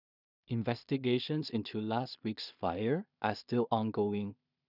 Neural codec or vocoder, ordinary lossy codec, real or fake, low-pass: codec, 16 kHz in and 24 kHz out, 0.4 kbps, LongCat-Audio-Codec, two codebook decoder; none; fake; 5.4 kHz